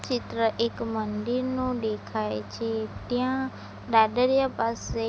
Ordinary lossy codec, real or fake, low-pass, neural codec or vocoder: none; real; none; none